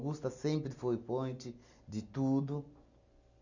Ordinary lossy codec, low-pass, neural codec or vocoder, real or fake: none; 7.2 kHz; none; real